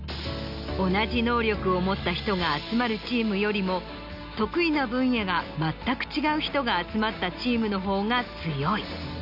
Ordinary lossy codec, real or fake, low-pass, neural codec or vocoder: none; real; 5.4 kHz; none